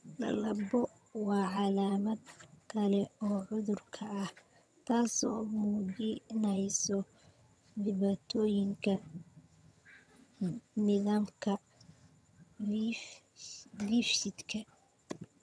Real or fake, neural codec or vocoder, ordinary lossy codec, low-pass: fake; vocoder, 22.05 kHz, 80 mel bands, HiFi-GAN; none; none